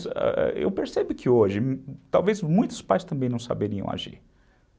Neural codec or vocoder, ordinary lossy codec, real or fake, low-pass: none; none; real; none